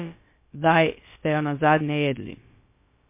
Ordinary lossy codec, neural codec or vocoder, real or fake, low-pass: MP3, 24 kbps; codec, 16 kHz, about 1 kbps, DyCAST, with the encoder's durations; fake; 3.6 kHz